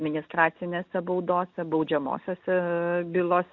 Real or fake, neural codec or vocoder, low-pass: real; none; 7.2 kHz